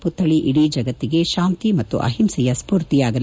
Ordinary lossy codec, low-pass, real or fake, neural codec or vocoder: none; none; real; none